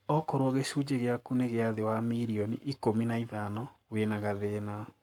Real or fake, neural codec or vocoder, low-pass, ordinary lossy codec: fake; codec, 44.1 kHz, 7.8 kbps, Pupu-Codec; 19.8 kHz; none